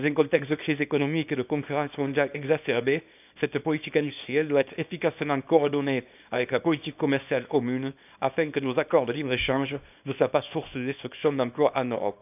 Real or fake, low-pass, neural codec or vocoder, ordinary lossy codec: fake; 3.6 kHz; codec, 24 kHz, 0.9 kbps, WavTokenizer, small release; none